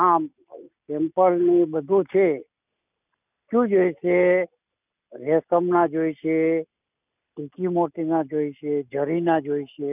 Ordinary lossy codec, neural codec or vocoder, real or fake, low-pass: none; none; real; 3.6 kHz